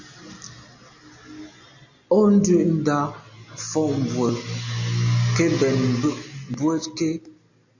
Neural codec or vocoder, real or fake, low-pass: none; real; 7.2 kHz